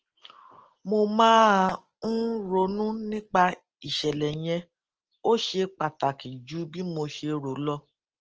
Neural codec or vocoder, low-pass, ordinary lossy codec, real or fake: none; 7.2 kHz; Opus, 16 kbps; real